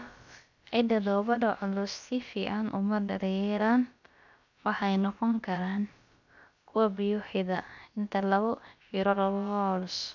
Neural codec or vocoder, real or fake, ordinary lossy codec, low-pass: codec, 16 kHz, about 1 kbps, DyCAST, with the encoder's durations; fake; none; 7.2 kHz